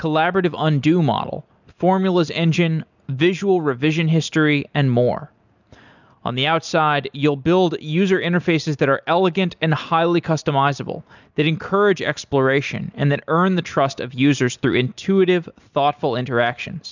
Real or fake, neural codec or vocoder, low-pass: real; none; 7.2 kHz